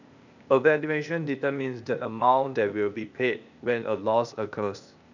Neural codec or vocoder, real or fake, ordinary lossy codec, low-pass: codec, 16 kHz, 0.8 kbps, ZipCodec; fake; none; 7.2 kHz